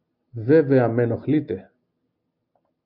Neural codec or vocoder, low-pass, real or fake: none; 5.4 kHz; real